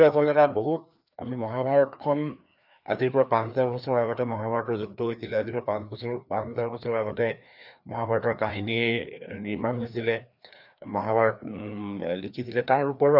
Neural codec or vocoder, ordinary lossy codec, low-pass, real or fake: codec, 16 kHz, 2 kbps, FreqCodec, larger model; none; 5.4 kHz; fake